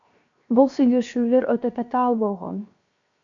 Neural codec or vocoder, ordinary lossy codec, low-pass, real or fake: codec, 16 kHz, 0.7 kbps, FocalCodec; AAC, 64 kbps; 7.2 kHz; fake